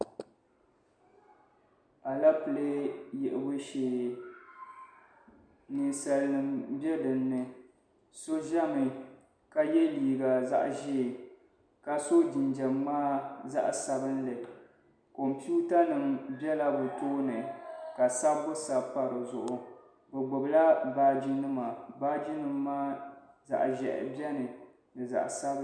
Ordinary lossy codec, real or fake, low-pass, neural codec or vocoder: MP3, 96 kbps; real; 9.9 kHz; none